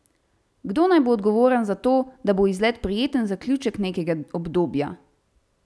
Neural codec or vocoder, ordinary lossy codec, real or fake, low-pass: none; none; real; none